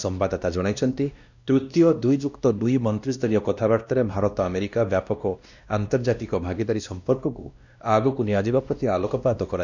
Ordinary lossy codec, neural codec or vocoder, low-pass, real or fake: none; codec, 16 kHz, 1 kbps, X-Codec, WavLM features, trained on Multilingual LibriSpeech; 7.2 kHz; fake